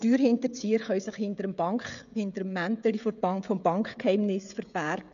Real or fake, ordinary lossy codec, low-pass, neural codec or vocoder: fake; none; 7.2 kHz; codec, 16 kHz, 16 kbps, FreqCodec, smaller model